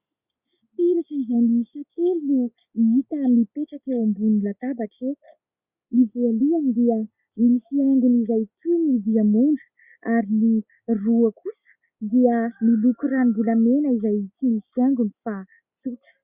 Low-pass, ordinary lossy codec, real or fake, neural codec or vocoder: 3.6 kHz; Opus, 64 kbps; real; none